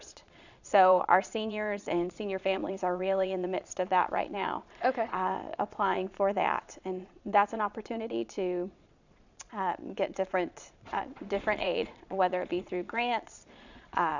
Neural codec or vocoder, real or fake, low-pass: vocoder, 22.05 kHz, 80 mel bands, Vocos; fake; 7.2 kHz